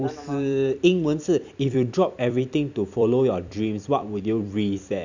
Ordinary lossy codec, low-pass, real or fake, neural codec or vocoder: none; 7.2 kHz; fake; vocoder, 44.1 kHz, 128 mel bands every 256 samples, BigVGAN v2